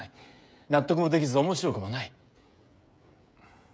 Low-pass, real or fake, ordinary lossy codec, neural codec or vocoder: none; fake; none; codec, 16 kHz, 16 kbps, FreqCodec, smaller model